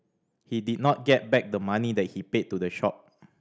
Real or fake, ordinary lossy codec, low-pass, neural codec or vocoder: real; none; none; none